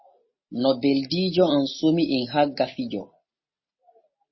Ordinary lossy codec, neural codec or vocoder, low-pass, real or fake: MP3, 24 kbps; none; 7.2 kHz; real